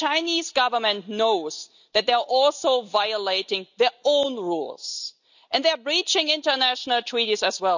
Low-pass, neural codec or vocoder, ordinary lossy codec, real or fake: 7.2 kHz; none; none; real